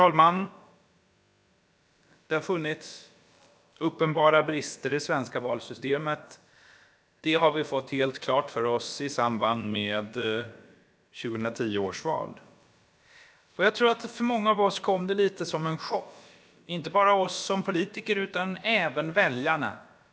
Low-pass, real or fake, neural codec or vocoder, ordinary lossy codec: none; fake; codec, 16 kHz, about 1 kbps, DyCAST, with the encoder's durations; none